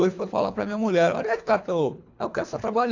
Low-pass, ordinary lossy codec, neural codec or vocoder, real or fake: 7.2 kHz; AAC, 48 kbps; codec, 24 kHz, 3 kbps, HILCodec; fake